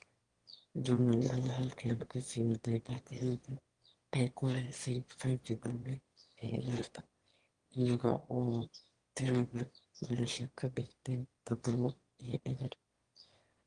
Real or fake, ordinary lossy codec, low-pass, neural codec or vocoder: fake; Opus, 24 kbps; 9.9 kHz; autoencoder, 22.05 kHz, a latent of 192 numbers a frame, VITS, trained on one speaker